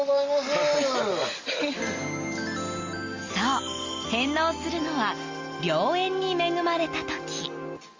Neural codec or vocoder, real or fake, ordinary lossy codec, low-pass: none; real; Opus, 32 kbps; 7.2 kHz